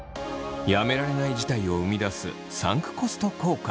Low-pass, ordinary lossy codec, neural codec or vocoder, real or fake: none; none; none; real